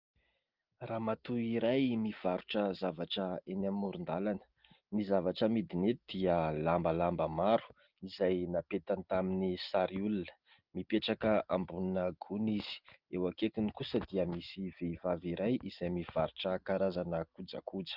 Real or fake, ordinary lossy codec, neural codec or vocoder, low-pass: real; Opus, 32 kbps; none; 5.4 kHz